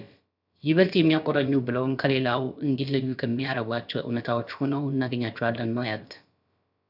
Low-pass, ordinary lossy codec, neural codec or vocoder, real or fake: 5.4 kHz; AAC, 48 kbps; codec, 16 kHz, about 1 kbps, DyCAST, with the encoder's durations; fake